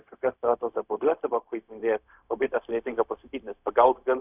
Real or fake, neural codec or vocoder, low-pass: fake; codec, 16 kHz, 0.4 kbps, LongCat-Audio-Codec; 3.6 kHz